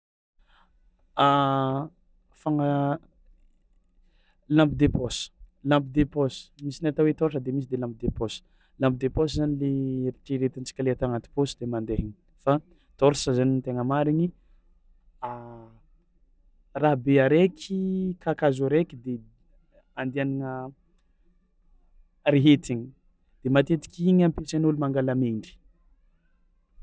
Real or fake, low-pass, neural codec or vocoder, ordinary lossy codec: real; none; none; none